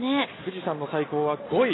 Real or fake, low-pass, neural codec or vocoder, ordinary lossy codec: real; 7.2 kHz; none; AAC, 16 kbps